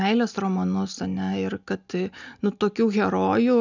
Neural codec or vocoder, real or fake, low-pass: none; real; 7.2 kHz